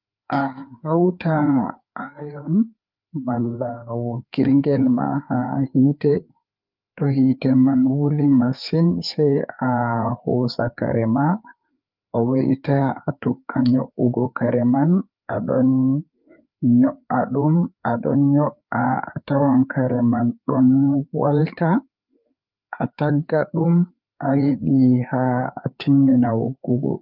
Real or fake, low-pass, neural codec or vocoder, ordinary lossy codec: fake; 5.4 kHz; codec, 16 kHz, 4 kbps, FreqCodec, larger model; Opus, 32 kbps